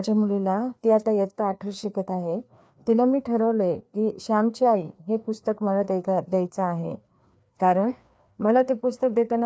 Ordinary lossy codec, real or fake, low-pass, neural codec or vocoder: none; fake; none; codec, 16 kHz, 2 kbps, FreqCodec, larger model